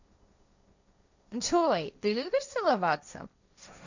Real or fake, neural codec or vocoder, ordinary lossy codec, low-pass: fake; codec, 16 kHz, 1.1 kbps, Voila-Tokenizer; none; 7.2 kHz